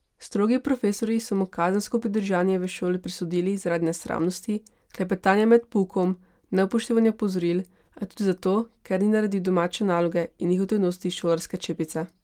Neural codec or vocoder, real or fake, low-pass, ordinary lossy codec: none; real; 19.8 kHz; Opus, 24 kbps